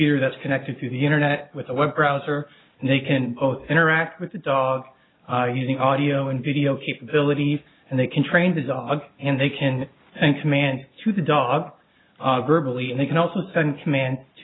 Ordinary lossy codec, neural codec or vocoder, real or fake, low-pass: AAC, 16 kbps; none; real; 7.2 kHz